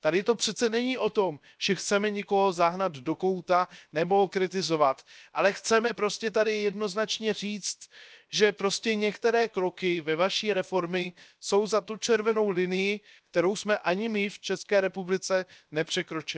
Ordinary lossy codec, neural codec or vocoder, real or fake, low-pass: none; codec, 16 kHz, 0.7 kbps, FocalCodec; fake; none